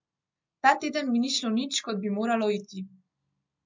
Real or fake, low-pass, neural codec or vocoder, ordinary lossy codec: real; 7.2 kHz; none; MP3, 64 kbps